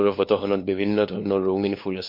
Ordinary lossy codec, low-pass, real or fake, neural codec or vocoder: MP3, 32 kbps; 5.4 kHz; fake; codec, 16 kHz, 2 kbps, FunCodec, trained on LibriTTS, 25 frames a second